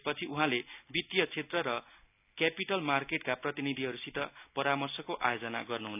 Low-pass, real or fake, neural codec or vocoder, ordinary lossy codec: 3.6 kHz; real; none; none